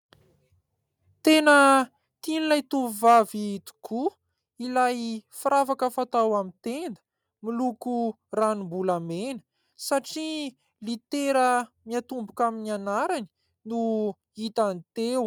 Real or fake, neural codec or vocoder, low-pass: real; none; 19.8 kHz